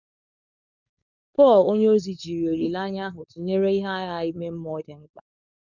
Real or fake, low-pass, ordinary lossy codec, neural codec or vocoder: fake; 7.2 kHz; none; codec, 16 kHz, 4.8 kbps, FACodec